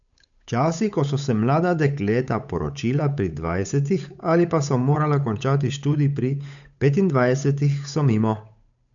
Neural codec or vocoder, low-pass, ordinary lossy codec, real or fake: codec, 16 kHz, 8 kbps, FunCodec, trained on Chinese and English, 25 frames a second; 7.2 kHz; none; fake